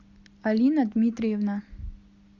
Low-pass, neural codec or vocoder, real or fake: 7.2 kHz; none; real